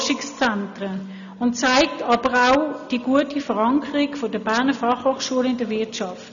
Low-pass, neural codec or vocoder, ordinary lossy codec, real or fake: 7.2 kHz; none; none; real